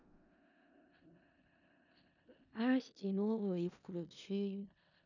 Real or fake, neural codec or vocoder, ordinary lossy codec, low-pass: fake; codec, 16 kHz in and 24 kHz out, 0.4 kbps, LongCat-Audio-Codec, four codebook decoder; none; 7.2 kHz